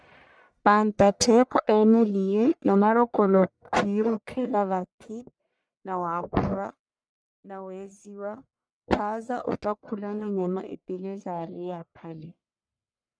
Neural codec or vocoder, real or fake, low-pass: codec, 44.1 kHz, 1.7 kbps, Pupu-Codec; fake; 9.9 kHz